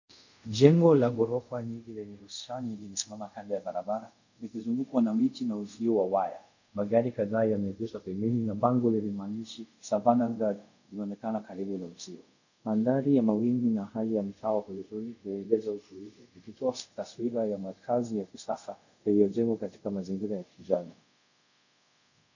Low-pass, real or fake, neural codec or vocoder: 7.2 kHz; fake; codec, 24 kHz, 0.5 kbps, DualCodec